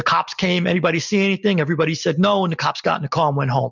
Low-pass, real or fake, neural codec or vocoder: 7.2 kHz; real; none